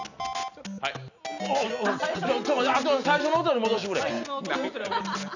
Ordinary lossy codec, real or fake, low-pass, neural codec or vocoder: none; real; 7.2 kHz; none